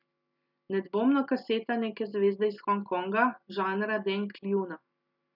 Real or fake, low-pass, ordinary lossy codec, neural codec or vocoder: real; 5.4 kHz; none; none